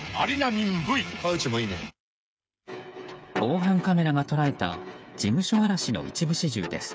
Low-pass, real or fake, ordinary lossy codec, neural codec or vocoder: none; fake; none; codec, 16 kHz, 8 kbps, FreqCodec, smaller model